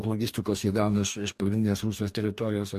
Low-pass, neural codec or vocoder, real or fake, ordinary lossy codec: 14.4 kHz; codec, 44.1 kHz, 2.6 kbps, DAC; fake; MP3, 64 kbps